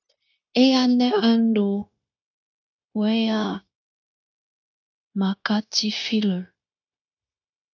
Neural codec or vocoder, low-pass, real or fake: codec, 16 kHz, 0.9 kbps, LongCat-Audio-Codec; 7.2 kHz; fake